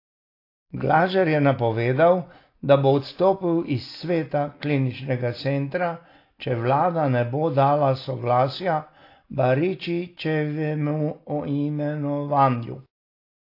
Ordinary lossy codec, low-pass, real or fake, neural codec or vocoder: AAC, 32 kbps; 5.4 kHz; real; none